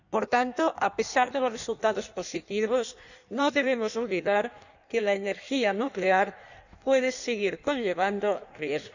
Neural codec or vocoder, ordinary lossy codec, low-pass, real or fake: codec, 16 kHz in and 24 kHz out, 1.1 kbps, FireRedTTS-2 codec; none; 7.2 kHz; fake